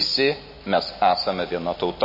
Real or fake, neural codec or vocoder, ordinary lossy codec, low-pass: real; none; MP3, 24 kbps; 5.4 kHz